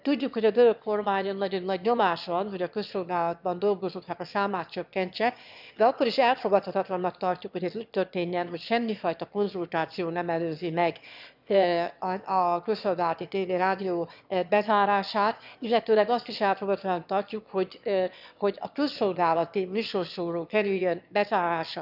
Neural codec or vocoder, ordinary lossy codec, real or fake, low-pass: autoencoder, 22.05 kHz, a latent of 192 numbers a frame, VITS, trained on one speaker; none; fake; 5.4 kHz